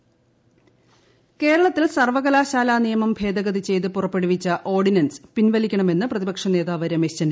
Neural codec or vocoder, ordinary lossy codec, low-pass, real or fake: none; none; none; real